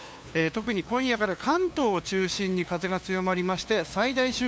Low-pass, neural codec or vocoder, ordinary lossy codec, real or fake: none; codec, 16 kHz, 2 kbps, FunCodec, trained on LibriTTS, 25 frames a second; none; fake